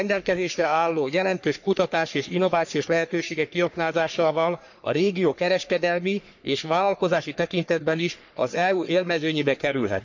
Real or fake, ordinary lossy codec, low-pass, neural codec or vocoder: fake; none; 7.2 kHz; codec, 44.1 kHz, 3.4 kbps, Pupu-Codec